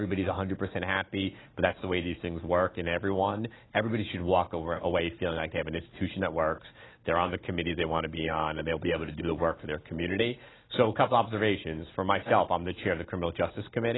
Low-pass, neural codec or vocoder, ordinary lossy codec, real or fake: 7.2 kHz; none; AAC, 16 kbps; real